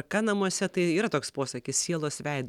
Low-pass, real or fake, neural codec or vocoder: 19.8 kHz; real; none